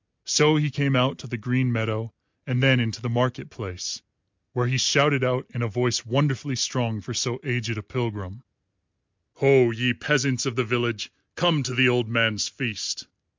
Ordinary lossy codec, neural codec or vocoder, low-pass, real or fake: MP3, 64 kbps; none; 7.2 kHz; real